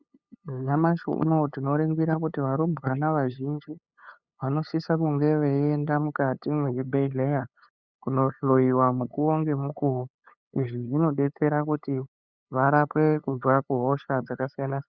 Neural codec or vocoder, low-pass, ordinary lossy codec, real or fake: codec, 16 kHz, 8 kbps, FunCodec, trained on LibriTTS, 25 frames a second; 7.2 kHz; Opus, 64 kbps; fake